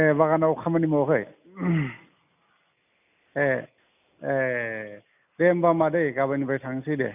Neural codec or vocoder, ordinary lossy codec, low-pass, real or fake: none; none; 3.6 kHz; real